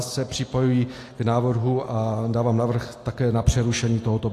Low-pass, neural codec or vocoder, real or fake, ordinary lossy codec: 14.4 kHz; none; real; AAC, 48 kbps